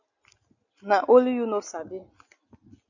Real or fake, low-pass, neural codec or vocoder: real; 7.2 kHz; none